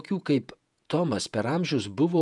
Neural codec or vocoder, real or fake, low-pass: vocoder, 48 kHz, 128 mel bands, Vocos; fake; 10.8 kHz